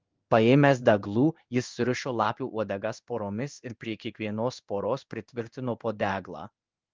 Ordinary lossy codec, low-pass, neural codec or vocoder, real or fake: Opus, 24 kbps; 7.2 kHz; codec, 16 kHz in and 24 kHz out, 1 kbps, XY-Tokenizer; fake